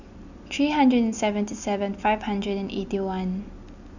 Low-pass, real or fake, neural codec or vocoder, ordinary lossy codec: 7.2 kHz; real; none; none